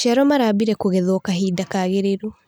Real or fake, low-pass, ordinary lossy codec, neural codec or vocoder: real; none; none; none